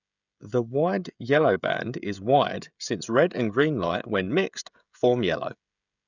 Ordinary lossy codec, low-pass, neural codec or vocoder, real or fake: none; 7.2 kHz; codec, 16 kHz, 16 kbps, FreqCodec, smaller model; fake